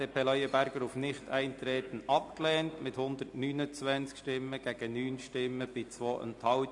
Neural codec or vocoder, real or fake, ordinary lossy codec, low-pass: none; real; AAC, 64 kbps; 10.8 kHz